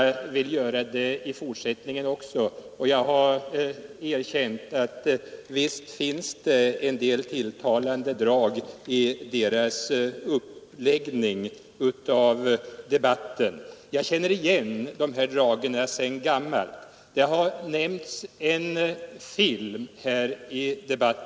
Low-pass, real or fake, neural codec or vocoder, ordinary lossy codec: none; real; none; none